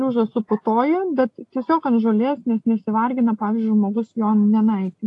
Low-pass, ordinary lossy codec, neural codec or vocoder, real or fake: 10.8 kHz; AAC, 48 kbps; none; real